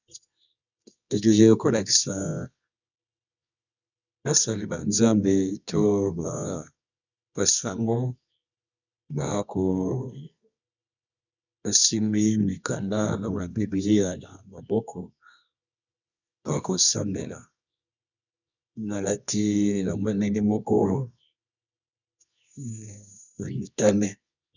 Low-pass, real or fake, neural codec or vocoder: 7.2 kHz; fake; codec, 24 kHz, 0.9 kbps, WavTokenizer, medium music audio release